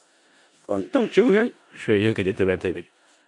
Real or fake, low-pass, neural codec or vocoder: fake; 10.8 kHz; codec, 16 kHz in and 24 kHz out, 0.4 kbps, LongCat-Audio-Codec, four codebook decoder